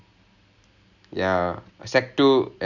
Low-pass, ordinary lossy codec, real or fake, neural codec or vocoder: 7.2 kHz; none; real; none